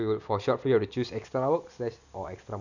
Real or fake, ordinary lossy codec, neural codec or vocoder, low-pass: real; none; none; 7.2 kHz